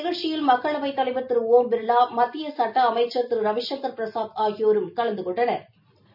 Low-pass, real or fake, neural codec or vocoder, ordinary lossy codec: 5.4 kHz; real; none; none